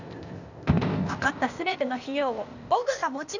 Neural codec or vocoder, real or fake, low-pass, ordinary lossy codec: codec, 16 kHz, 0.8 kbps, ZipCodec; fake; 7.2 kHz; none